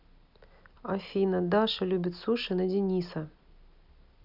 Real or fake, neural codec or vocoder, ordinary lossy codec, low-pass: real; none; none; 5.4 kHz